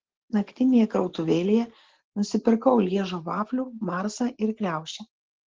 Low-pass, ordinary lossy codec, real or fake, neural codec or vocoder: 7.2 kHz; Opus, 16 kbps; real; none